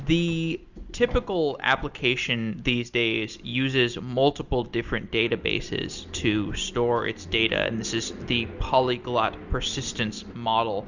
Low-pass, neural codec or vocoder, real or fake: 7.2 kHz; none; real